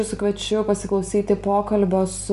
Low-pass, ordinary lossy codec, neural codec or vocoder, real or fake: 10.8 kHz; MP3, 96 kbps; none; real